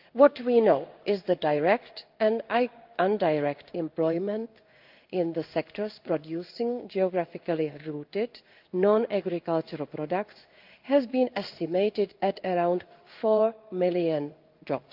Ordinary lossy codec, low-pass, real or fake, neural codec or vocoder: Opus, 24 kbps; 5.4 kHz; fake; codec, 16 kHz in and 24 kHz out, 1 kbps, XY-Tokenizer